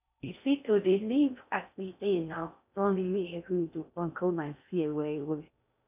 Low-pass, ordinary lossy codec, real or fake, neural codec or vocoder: 3.6 kHz; none; fake; codec, 16 kHz in and 24 kHz out, 0.6 kbps, FocalCodec, streaming, 4096 codes